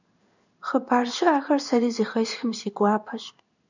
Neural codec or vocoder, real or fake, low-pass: codec, 16 kHz in and 24 kHz out, 1 kbps, XY-Tokenizer; fake; 7.2 kHz